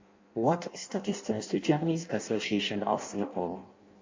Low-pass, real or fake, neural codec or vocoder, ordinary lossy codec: 7.2 kHz; fake; codec, 16 kHz in and 24 kHz out, 0.6 kbps, FireRedTTS-2 codec; MP3, 48 kbps